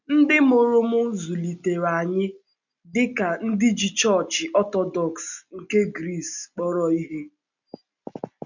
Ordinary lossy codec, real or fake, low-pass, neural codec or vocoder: none; real; 7.2 kHz; none